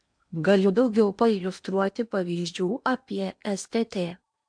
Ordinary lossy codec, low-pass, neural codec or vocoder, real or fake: MP3, 64 kbps; 9.9 kHz; codec, 16 kHz in and 24 kHz out, 0.8 kbps, FocalCodec, streaming, 65536 codes; fake